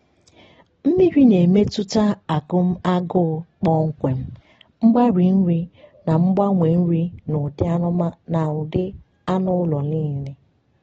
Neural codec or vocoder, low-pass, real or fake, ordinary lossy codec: none; 19.8 kHz; real; AAC, 24 kbps